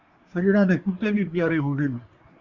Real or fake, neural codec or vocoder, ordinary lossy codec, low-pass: fake; codec, 24 kHz, 1 kbps, SNAC; Opus, 64 kbps; 7.2 kHz